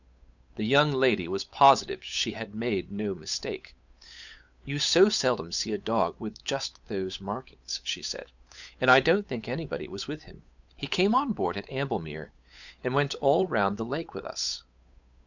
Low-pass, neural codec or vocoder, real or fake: 7.2 kHz; codec, 16 kHz, 8 kbps, FunCodec, trained on Chinese and English, 25 frames a second; fake